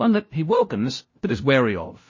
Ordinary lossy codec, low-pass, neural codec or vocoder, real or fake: MP3, 32 kbps; 7.2 kHz; codec, 16 kHz, 0.5 kbps, FunCodec, trained on LibriTTS, 25 frames a second; fake